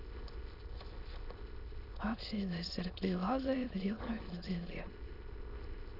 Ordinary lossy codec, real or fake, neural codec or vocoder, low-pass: none; fake; autoencoder, 22.05 kHz, a latent of 192 numbers a frame, VITS, trained on many speakers; 5.4 kHz